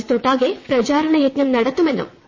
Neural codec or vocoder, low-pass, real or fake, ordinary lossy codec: vocoder, 22.05 kHz, 80 mel bands, WaveNeXt; 7.2 kHz; fake; MP3, 32 kbps